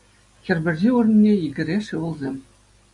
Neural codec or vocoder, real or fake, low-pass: none; real; 10.8 kHz